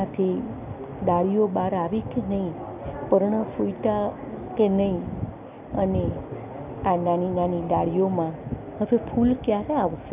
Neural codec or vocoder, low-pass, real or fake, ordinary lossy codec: none; 3.6 kHz; real; none